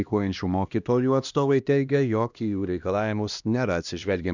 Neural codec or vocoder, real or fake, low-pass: codec, 16 kHz, 1 kbps, X-Codec, HuBERT features, trained on LibriSpeech; fake; 7.2 kHz